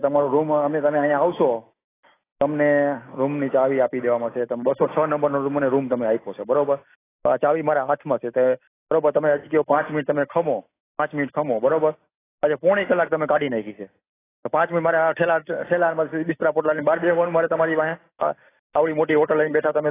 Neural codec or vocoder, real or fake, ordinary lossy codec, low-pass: none; real; AAC, 16 kbps; 3.6 kHz